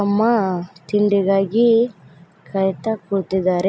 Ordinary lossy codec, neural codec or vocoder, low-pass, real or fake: none; none; none; real